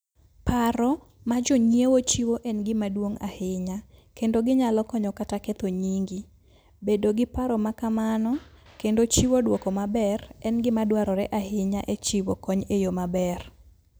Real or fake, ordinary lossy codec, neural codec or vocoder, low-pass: real; none; none; none